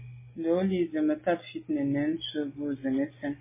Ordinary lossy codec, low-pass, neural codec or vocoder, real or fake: MP3, 16 kbps; 3.6 kHz; none; real